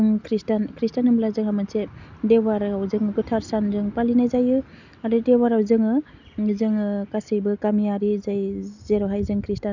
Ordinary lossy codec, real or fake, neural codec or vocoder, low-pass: none; real; none; 7.2 kHz